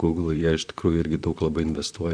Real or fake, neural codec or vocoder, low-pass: fake; vocoder, 44.1 kHz, 128 mel bands, Pupu-Vocoder; 9.9 kHz